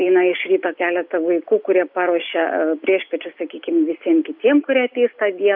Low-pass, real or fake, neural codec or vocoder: 5.4 kHz; real; none